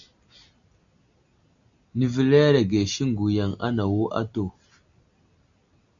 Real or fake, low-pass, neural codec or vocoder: real; 7.2 kHz; none